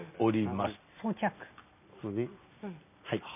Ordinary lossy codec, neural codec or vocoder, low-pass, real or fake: none; none; 3.6 kHz; real